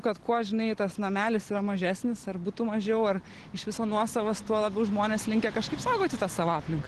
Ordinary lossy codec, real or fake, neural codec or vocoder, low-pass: Opus, 16 kbps; real; none; 10.8 kHz